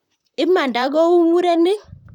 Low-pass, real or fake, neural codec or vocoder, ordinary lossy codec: 19.8 kHz; fake; vocoder, 44.1 kHz, 128 mel bands, Pupu-Vocoder; none